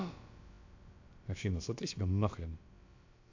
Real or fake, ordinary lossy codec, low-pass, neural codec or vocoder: fake; AAC, 48 kbps; 7.2 kHz; codec, 16 kHz, about 1 kbps, DyCAST, with the encoder's durations